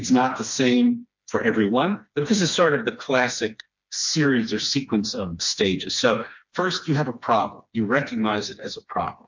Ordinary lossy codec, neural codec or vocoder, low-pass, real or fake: MP3, 48 kbps; codec, 16 kHz, 2 kbps, FreqCodec, smaller model; 7.2 kHz; fake